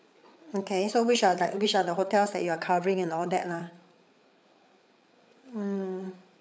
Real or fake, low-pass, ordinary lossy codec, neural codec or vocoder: fake; none; none; codec, 16 kHz, 8 kbps, FreqCodec, larger model